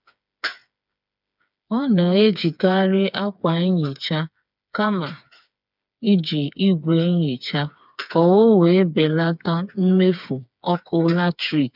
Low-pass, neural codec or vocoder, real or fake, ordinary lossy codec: 5.4 kHz; codec, 16 kHz, 4 kbps, FreqCodec, smaller model; fake; none